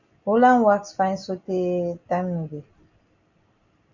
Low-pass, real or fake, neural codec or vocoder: 7.2 kHz; real; none